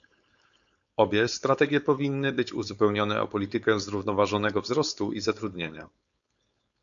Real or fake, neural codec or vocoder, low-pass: fake; codec, 16 kHz, 4.8 kbps, FACodec; 7.2 kHz